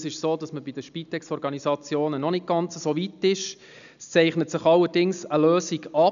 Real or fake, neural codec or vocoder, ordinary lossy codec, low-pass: real; none; none; 7.2 kHz